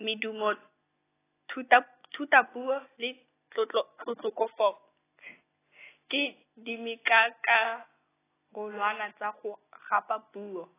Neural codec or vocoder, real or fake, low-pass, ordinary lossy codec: none; real; 3.6 kHz; AAC, 16 kbps